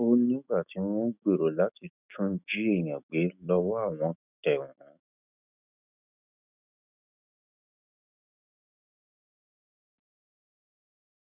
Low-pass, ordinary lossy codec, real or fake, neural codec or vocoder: 3.6 kHz; none; fake; autoencoder, 48 kHz, 128 numbers a frame, DAC-VAE, trained on Japanese speech